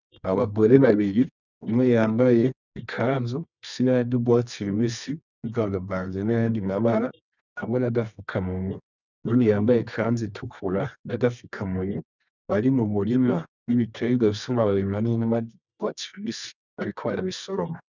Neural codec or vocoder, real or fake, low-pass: codec, 24 kHz, 0.9 kbps, WavTokenizer, medium music audio release; fake; 7.2 kHz